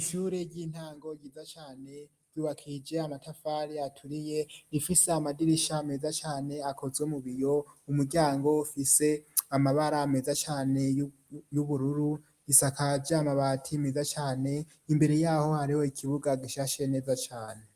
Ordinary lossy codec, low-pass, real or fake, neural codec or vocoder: Opus, 32 kbps; 14.4 kHz; real; none